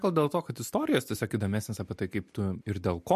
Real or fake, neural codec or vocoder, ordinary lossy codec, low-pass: real; none; MP3, 64 kbps; 14.4 kHz